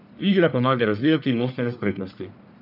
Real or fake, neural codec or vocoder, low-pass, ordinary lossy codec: fake; codec, 44.1 kHz, 1.7 kbps, Pupu-Codec; 5.4 kHz; none